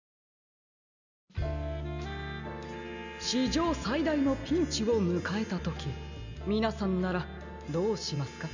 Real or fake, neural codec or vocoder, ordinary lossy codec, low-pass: real; none; none; 7.2 kHz